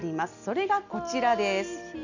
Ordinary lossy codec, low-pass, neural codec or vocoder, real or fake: none; 7.2 kHz; none; real